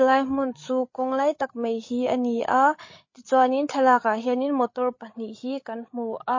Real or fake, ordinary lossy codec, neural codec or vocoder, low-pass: fake; MP3, 32 kbps; codec, 16 kHz, 16 kbps, FreqCodec, larger model; 7.2 kHz